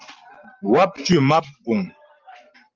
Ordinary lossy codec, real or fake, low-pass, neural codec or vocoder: Opus, 24 kbps; fake; 7.2 kHz; vocoder, 44.1 kHz, 128 mel bands every 512 samples, BigVGAN v2